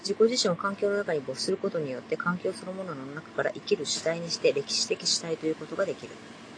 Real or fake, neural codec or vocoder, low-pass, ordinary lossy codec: real; none; 9.9 kHz; AAC, 32 kbps